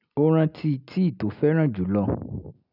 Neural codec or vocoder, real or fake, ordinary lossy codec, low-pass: none; real; none; 5.4 kHz